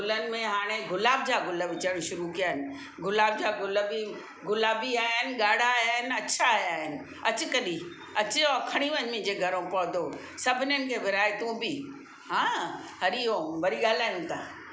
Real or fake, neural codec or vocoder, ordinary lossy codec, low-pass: real; none; none; none